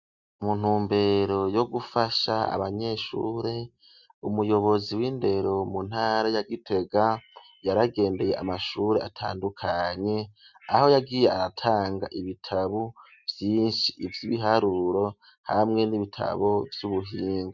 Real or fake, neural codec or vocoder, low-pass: real; none; 7.2 kHz